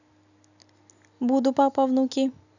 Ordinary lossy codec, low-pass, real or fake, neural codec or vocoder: none; 7.2 kHz; real; none